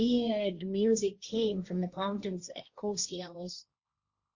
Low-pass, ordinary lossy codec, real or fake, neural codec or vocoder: 7.2 kHz; Opus, 64 kbps; fake; codec, 16 kHz, 1.1 kbps, Voila-Tokenizer